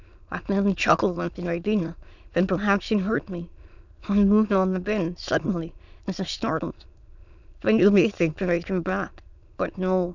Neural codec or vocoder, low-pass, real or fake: autoencoder, 22.05 kHz, a latent of 192 numbers a frame, VITS, trained on many speakers; 7.2 kHz; fake